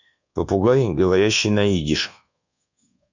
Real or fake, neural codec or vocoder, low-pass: fake; codec, 24 kHz, 1.2 kbps, DualCodec; 7.2 kHz